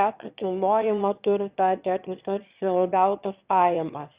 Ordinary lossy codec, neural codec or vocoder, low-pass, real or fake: Opus, 64 kbps; autoencoder, 22.05 kHz, a latent of 192 numbers a frame, VITS, trained on one speaker; 3.6 kHz; fake